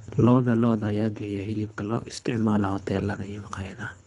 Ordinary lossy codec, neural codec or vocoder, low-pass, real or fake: none; codec, 24 kHz, 3 kbps, HILCodec; 10.8 kHz; fake